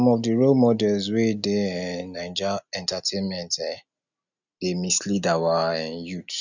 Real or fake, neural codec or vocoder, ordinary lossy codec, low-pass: real; none; none; 7.2 kHz